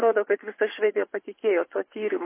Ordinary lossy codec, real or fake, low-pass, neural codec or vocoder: MP3, 24 kbps; fake; 3.6 kHz; vocoder, 24 kHz, 100 mel bands, Vocos